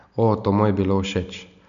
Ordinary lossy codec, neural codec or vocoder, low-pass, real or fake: none; none; 7.2 kHz; real